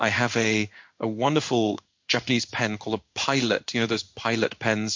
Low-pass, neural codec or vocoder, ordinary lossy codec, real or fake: 7.2 kHz; codec, 16 kHz in and 24 kHz out, 1 kbps, XY-Tokenizer; MP3, 48 kbps; fake